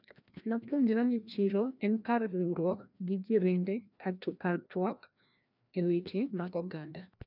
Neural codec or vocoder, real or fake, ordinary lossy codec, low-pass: codec, 16 kHz, 1 kbps, FreqCodec, larger model; fake; none; 5.4 kHz